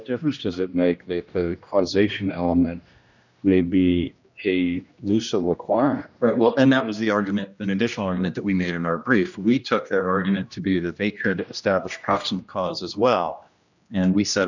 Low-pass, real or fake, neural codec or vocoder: 7.2 kHz; fake; codec, 16 kHz, 1 kbps, X-Codec, HuBERT features, trained on general audio